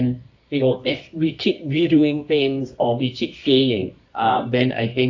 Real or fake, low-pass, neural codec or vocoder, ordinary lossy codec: fake; 7.2 kHz; codec, 24 kHz, 0.9 kbps, WavTokenizer, medium music audio release; AAC, 48 kbps